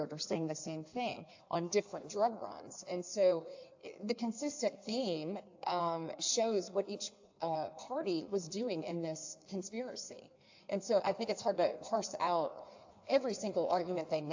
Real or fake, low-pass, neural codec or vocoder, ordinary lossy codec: fake; 7.2 kHz; codec, 16 kHz in and 24 kHz out, 1.1 kbps, FireRedTTS-2 codec; AAC, 48 kbps